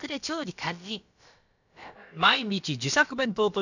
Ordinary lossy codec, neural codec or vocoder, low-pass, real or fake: none; codec, 16 kHz, about 1 kbps, DyCAST, with the encoder's durations; 7.2 kHz; fake